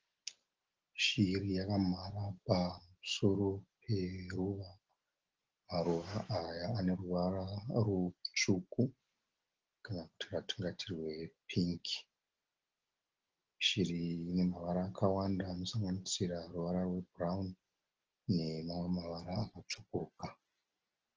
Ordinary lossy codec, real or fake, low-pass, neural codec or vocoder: Opus, 16 kbps; real; 7.2 kHz; none